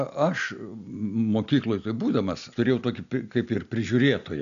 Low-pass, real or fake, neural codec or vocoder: 7.2 kHz; real; none